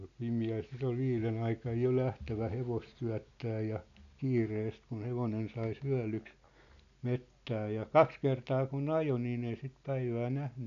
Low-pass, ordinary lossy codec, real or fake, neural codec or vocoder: 7.2 kHz; none; real; none